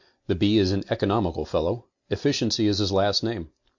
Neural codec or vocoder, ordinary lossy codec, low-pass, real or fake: none; MP3, 48 kbps; 7.2 kHz; real